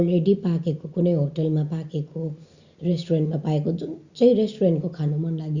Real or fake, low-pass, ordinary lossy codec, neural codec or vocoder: real; 7.2 kHz; Opus, 64 kbps; none